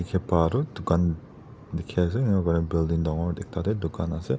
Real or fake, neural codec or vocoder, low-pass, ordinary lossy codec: real; none; none; none